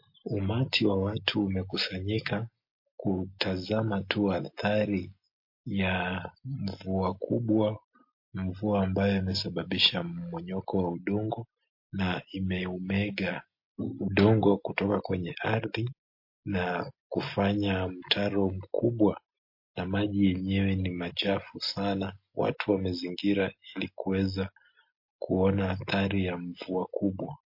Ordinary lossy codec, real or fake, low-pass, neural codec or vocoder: MP3, 32 kbps; real; 5.4 kHz; none